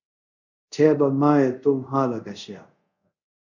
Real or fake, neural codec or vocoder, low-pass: fake; codec, 24 kHz, 0.5 kbps, DualCodec; 7.2 kHz